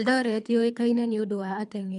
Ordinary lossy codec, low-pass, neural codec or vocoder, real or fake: none; 10.8 kHz; codec, 24 kHz, 3 kbps, HILCodec; fake